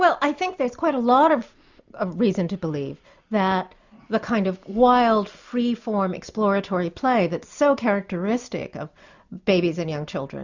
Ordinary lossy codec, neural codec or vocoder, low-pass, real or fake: Opus, 64 kbps; none; 7.2 kHz; real